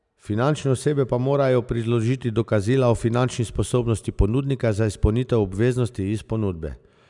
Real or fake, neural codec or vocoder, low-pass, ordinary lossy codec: real; none; 10.8 kHz; none